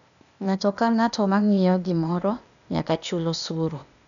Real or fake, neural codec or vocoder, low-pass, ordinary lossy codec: fake; codec, 16 kHz, 0.8 kbps, ZipCodec; 7.2 kHz; none